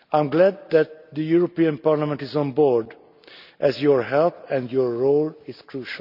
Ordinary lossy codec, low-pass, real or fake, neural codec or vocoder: none; 5.4 kHz; real; none